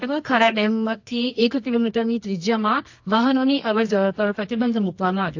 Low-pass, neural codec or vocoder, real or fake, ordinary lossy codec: 7.2 kHz; codec, 24 kHz, 0.9 kbps, WavTokenizer, medium music audio release; fake; AAC, 48 kbps